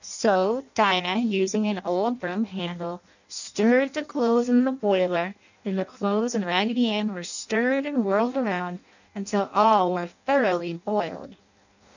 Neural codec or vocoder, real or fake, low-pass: codec, 16 kHz in and 24 kHz out, 0.6 kbps, FireRedTTS-2 codec; fake; 7.2 kHz